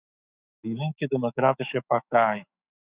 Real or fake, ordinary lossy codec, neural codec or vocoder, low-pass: fake; Opus, 64 kbps; autoencoder, 48 kHz, 128 numbers a frame, DAC-VAE, trained on Japanese speech; 3.6 kHz